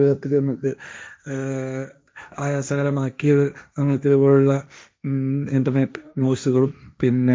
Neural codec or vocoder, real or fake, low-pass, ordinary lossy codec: codec, 16 kHz, 1.1 kbps, Voila-Tokenizer; fake; none; none